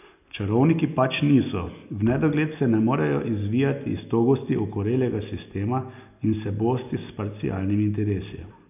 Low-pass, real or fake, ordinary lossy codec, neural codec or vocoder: 3.6 kHz; real; none; none